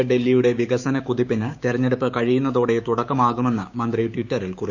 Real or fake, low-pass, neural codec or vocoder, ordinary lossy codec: fake; 7.2 kHz; codec, 16 kHz, 6 kbps, DAC; none